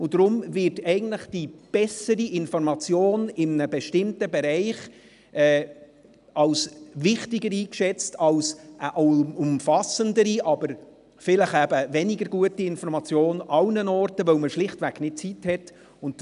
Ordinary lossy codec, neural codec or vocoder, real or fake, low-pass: none; none; real; 10.8 kHz